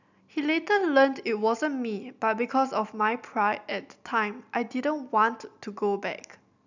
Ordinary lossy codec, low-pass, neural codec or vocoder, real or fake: none; 7.2 kHz; none; real